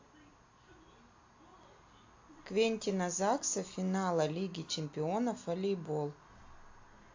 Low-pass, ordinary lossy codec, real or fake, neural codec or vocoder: 7.2 kHz; AAC, 48 kbps; real; none